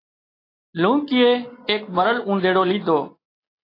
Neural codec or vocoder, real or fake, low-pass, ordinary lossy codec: none; real; 5.4 kHz; AAC, 24 kbps